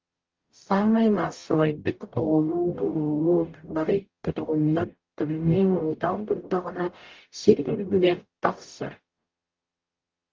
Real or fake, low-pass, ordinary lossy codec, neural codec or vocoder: fake; 7.2 kHz; Opus, 32 kbps; codec, 44.1 kHz, 0.9 kbps, DAC